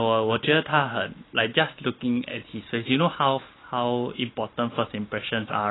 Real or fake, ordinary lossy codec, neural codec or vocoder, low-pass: real; AAC, 16 kbps; none; 7.2 kHz